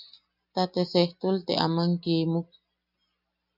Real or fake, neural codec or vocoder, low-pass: real; none; 5.4 kHz